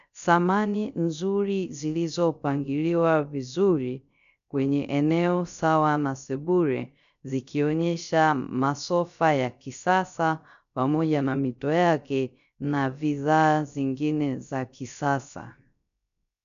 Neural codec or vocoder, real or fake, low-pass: codec, 16 kHz, 0.3 kbps, FocalCodec; fake; 7.2 kHz